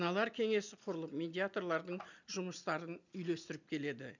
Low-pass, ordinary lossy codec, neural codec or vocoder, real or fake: 7.2 kHz; none; none; real